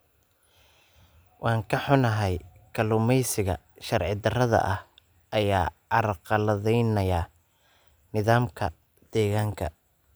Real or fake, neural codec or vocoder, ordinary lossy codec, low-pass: real; none; none; none